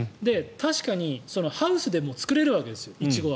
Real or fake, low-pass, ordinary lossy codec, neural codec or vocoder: real; none; none; none